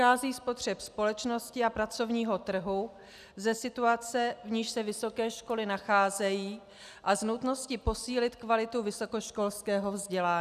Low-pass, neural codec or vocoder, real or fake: 14.4 kHz; none; real